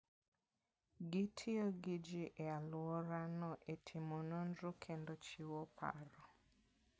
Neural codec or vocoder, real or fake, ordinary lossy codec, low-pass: none; real; none; none